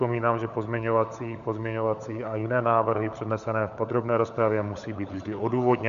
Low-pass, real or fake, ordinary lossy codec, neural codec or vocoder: 7.2 kHz; fake; AAC, 96 kbps; codec, 16 kHz, 16 kbps, FunCodec, trained on LibriTTS, 50 frames a second